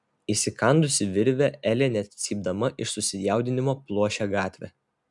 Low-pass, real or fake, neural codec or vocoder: 10.8 kHz; real; none